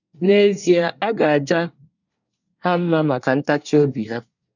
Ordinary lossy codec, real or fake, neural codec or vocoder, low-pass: none; fake; codec, 24 kHz, 1 kbps, SNAC; 7.2 kHz